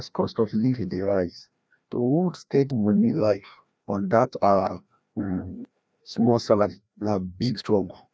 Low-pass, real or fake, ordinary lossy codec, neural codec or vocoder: none; fake; none; codec, 16 kHz, 1 kbps, FreqCodec, larger model